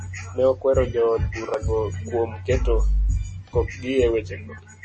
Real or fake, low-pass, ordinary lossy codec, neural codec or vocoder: real; 10.8 kHz; MP3, 32 kbps; none